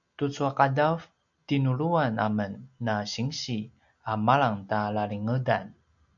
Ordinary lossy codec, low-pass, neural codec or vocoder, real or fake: MP3, 96 kbps; 7.2 kHz; none; real